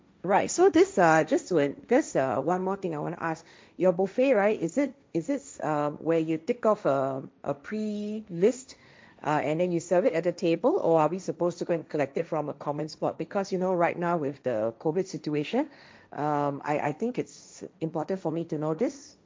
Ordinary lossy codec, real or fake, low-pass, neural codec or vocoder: none; fake; none; codec, 16 kHz, 1.1 kbps, Voila-Tokenizer